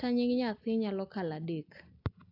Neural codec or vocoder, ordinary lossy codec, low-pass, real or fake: none; AAC, 48 kbps; 5.4 kHz; real